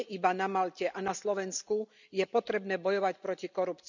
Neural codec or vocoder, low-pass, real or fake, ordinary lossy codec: none; 7.2 kHz; real; none